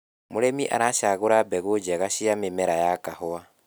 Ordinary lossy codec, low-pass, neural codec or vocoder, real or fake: none; none; none; real